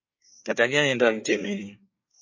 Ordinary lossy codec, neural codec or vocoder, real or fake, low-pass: MP3, 32 kbps; codec, 24 kHz, 1 kbps, SNAC; fake; 7.2 kHz